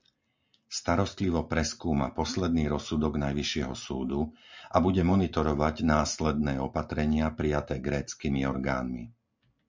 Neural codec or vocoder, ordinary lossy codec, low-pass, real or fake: none; MP3, 64 kbps; 7.2 kHz; real